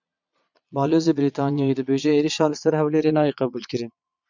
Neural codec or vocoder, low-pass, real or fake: vocoder, 22.05 kHz, 80 mel bands, Vocos; 7.2 kHz; fake